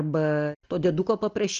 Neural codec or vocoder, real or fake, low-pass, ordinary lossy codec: none; real; 7.2 kHz; Opus, 24 kbps